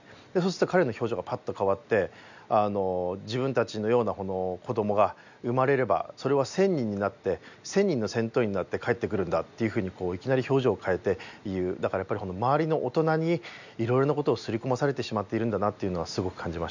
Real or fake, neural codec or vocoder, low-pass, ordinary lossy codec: real; none; 7.2 kHz; none